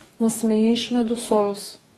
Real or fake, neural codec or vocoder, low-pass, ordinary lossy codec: fake; codec, 44.1 kHz, 2.6 kbps, DAC; 19.8 kHz; AAC, 32 kbps